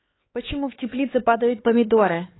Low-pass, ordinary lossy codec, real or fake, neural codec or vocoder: 7.2 kHz; AAC, 16 kbps; fake; codec, 16 kHz, 4 kbps, X-Codec, HuBERT features, trained on LibriSpeech